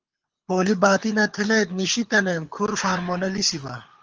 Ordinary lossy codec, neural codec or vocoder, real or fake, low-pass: Opus, 24 kbps; codec, 24 kHz, 6 kbps, HILCodec; fake; 7.2 kHz